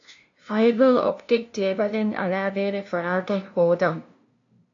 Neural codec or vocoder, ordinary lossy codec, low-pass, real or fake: codec, 16 kHz, 0.5 kbps, FunCodec, trained on LibriTTS, 25 frames a second; AAC, 48 kbps; 7.2 kHz; fake